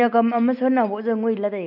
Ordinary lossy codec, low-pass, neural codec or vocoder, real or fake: none; 5.4 kHz; none; real